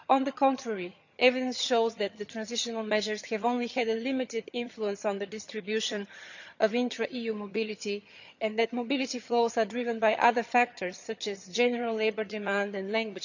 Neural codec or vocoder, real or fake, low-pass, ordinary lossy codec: vocoder, 22.05 kHz, 80 mel bands, HiFi-GAN; fake; 7.2 kHz; none